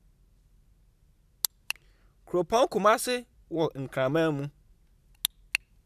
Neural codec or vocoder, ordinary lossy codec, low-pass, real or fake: none; none; 14.4 kHz; real